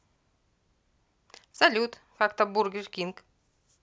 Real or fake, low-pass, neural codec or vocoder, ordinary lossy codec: real; none; none; none